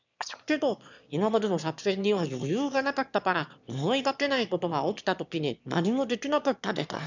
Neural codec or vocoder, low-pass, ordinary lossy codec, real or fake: autoencoder, 22.05 kHz, a latent of 192 numbers a frame, VITS, trained on one speaker; 7.2 kHz; none; fake